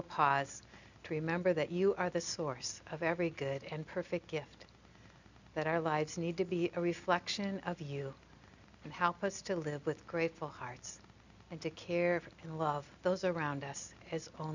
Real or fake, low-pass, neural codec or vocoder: real; 7.2 kHz; none